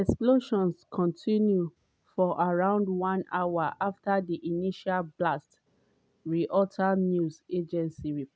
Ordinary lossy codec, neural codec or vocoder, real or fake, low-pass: none; none; real; none